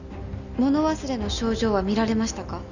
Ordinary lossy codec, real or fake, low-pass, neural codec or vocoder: none; real; 7.2 kHz; none